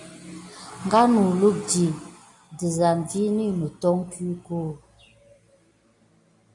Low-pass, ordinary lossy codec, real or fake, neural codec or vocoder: 10.8 kHz; AAC, 48 kbps; real; none